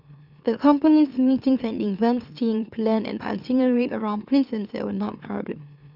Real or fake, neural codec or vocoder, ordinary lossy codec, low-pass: fake; autoencoder, 44.1 kHz, a latent of 192 numbers a frame, MeloTTS; none; 5.4 kHz